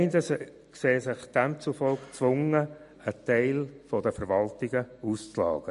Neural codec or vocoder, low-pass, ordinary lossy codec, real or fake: none; 10.8 kHz; MP3, 48 kbps; real